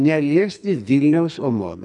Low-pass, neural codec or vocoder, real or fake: 10.8 kHz; codec, 44.1 kHz, 2.6 kbps, SNAC; fake